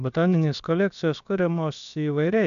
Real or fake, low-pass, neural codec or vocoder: fake; 7.2 kHz; codec, 16 kHz, about 1 kbps, DyCAST, with the encoder's durations